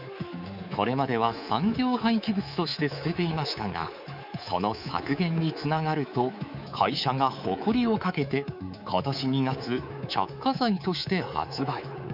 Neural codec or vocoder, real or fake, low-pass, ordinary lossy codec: codec, 24 kHz, 3.1 kbps, DualCodec; fake; 5.4 kHz; none